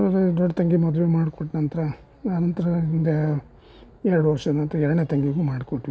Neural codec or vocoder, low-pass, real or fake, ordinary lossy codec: none; none; real; none